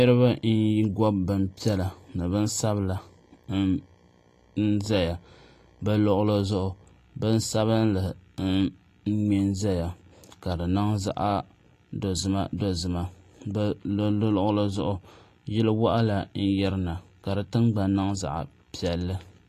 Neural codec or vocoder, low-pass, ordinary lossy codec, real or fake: none; 14.4 kHz; AAC, 48 kbps; real